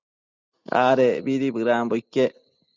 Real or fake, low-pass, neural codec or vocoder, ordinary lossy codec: real; 7.2 kHz; none; Opus, 64 kbps